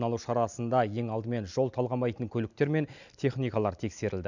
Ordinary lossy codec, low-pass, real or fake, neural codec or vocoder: none; 7.2 kHz; real; none